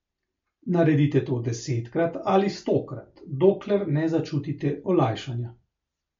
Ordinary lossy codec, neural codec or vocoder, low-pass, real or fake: MP3, 48 kbps; none; 7.2 kHz; real